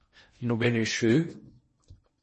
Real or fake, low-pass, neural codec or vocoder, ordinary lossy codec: fake; 10.8 kHz; codec, 16 kHz in and 24 kHz out, 0.8 kbps, FocalCodec, streaming, 65536 codes; MP3, 32 kbps